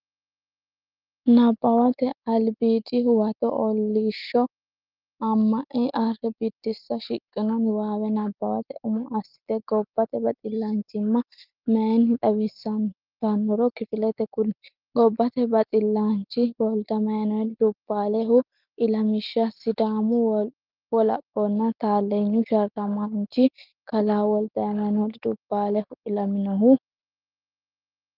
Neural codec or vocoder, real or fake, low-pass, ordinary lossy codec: none; real; 5.4 kHz; Opus, 24 kbps